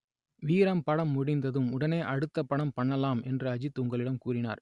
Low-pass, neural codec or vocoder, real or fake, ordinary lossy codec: 10.8 kHz; none; real; Opus, 64 kbps